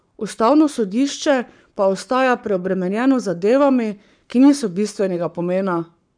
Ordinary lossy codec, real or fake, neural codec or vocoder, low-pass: none; fake; codec, 44.1 kHz, 7.8 kbps, Pupu-Codec; 9.9 kHz